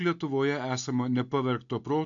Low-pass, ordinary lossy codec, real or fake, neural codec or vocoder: 7.2 kHz; AAC, 64 kbps; real; none